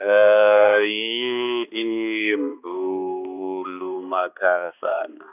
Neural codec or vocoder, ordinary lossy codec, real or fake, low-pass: codec, 16 kHz, 2 kbps, X-Codec, HuBERT features, trained on balanced general audio; none; fake; 3.6 kHz